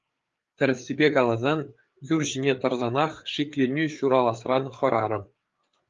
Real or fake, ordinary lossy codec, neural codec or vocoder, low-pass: fake; Opus, 32 kbps; codec, 16 kHz, 4 kbps, FreqCodec, larger model; 7.2 kHz